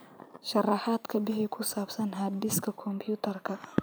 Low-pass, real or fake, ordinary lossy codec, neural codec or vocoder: none; fake; none; vocoder, 44.1 kHz, 128 mel bands every 512 samples, BigVGAN v2